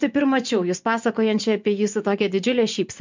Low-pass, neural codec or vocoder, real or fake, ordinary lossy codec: 7.2 kHz; vocoder, 24 kHz, 100 mel bands, Vocos; fake; MP3, 64 kbps